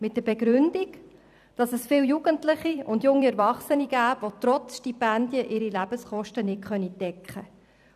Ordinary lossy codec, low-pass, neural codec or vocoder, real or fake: none; 14.4 kHz; vocoder, 44.1 kHz, 128 mel bands every 256 samples, BigVGAN v2; fake